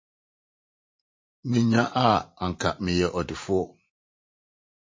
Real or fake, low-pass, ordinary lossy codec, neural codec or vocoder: fake; 7.2 kHz; MP3, 32 kbps; vocoder, 44.1 kHz, 80 mel bands, Vocos